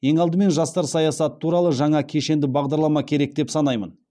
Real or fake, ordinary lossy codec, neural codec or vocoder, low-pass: real; none; none; none